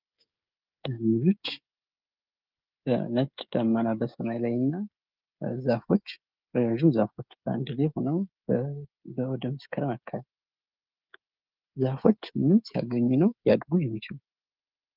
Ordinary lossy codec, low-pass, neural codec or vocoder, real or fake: Opus, 24 kbps; 5.4 kHz; codec, 16 kHz, 16 kbps, FreqCodec, smaller model; fake